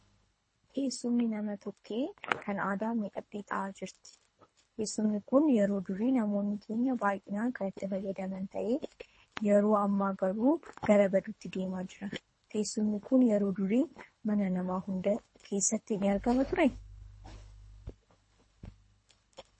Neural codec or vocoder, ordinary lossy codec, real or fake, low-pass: codec, 24 kHz, 3 kbps, HILCodec; MP3, 32 kbps; fake; 9.9 kHz